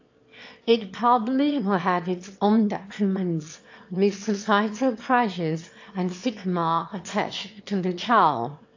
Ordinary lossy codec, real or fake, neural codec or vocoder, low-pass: AAC, 48 kbps; fake; autoencoder, 22.05 kHz, a latent of 192 numbers a frame, VITS, trained on one speaker; 7.2 kHz